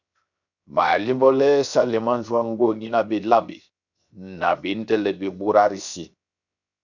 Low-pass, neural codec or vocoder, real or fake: 7.2 kHz; codec, 16 kHz, 0.7 kbps, FocalCodec; fake